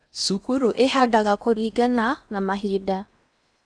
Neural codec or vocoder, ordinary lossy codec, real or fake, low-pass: codec, 16 kHz in and 24 kHz out, 0.6 kbps, FocalCodec, streaming, 4096 codes; none; fake; 9.9 kHz